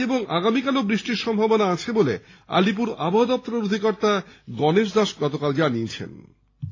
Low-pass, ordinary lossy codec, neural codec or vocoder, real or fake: 7.2 kHz; AAC, 32 kbps; none; real